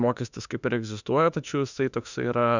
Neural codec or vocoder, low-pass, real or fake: autoencoder, 48 kHz, 32 numbers a frame, DAC-VAE, trained on Japanese speech; 7.2 kHz; fake